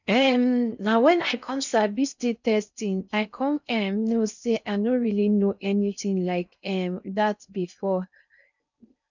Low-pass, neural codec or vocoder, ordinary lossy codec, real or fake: 7.2 kHz; codec, 16 kHz in and 24 kHz out, 0.6 kbps, FocalCodec, streaming, 4096 codes; none; fake